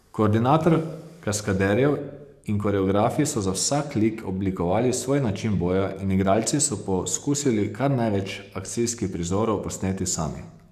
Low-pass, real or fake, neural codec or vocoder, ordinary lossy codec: 14.4 kHz; fake; codec, 44.1 kHz, 7.8 kbps, DAC; none